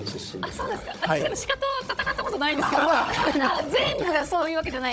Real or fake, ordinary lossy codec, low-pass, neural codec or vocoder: fake; none; none; codec, 16 kHz, 16 kbps, FunCodec, trained on Chinese and English, 50 frames a second